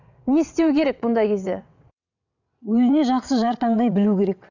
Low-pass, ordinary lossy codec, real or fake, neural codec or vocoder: 7.2 kHz; none; fake; vocoder, 22.05 kHz, 80 mel bands, WaveNeXt